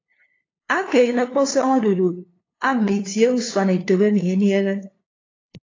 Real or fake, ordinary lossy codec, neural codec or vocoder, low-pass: fake; AAC, 32 kbps; codec, 16 kHz, 2 kbps, FunCodec, trained on LibriTTS, 25 frames a second; 7.2 kHz